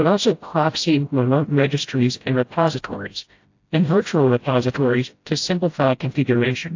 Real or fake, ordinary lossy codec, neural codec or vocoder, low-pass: fake; AAC, 48 kbps; codec, 16 kHz, 0.5 kbps, FreqCodec, smaller model; 7.2 kHz